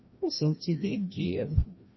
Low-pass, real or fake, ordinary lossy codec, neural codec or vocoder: 7.2 kHz; fake; MP3, 24 kbps; codec, 16 kHz, 1 kbps, FreqCodec, larger model